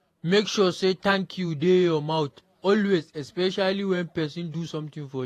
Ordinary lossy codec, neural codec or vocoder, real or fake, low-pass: AAC, 48 kbps; vocoder, 48 kHz, 128 mel bands, Vocos; fake; 14.4 kHz